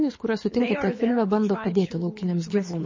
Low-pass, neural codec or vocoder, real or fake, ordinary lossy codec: 7.2 kHz; codec, 24 kHz, 6 kbps, HILCodec; fake; MP3, 32 kbps